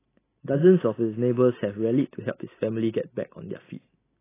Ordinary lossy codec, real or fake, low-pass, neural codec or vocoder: AAC, 16 kbps; real; 3.6 kHz; none